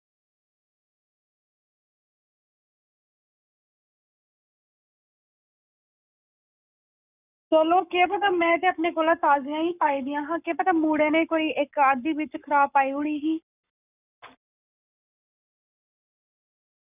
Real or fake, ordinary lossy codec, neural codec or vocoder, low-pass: fake; none; codec, 44.1 kHz, 7.8 kbps, Pupu-Codec; 3.6 kHz